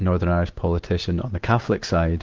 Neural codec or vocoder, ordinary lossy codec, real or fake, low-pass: none; Opus, 16 kbps; real; 7.2 kHz